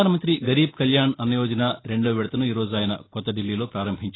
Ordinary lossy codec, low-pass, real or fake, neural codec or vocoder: AAC, 16 kbps; 7.2 kHz; real; none